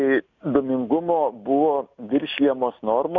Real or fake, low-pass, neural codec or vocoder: real; 7.2 kHz; none